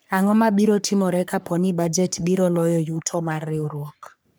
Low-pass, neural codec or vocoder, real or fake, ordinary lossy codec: none; codec, 44.1 kHz, 3.4 kbps, Pupu-Codec; fake; none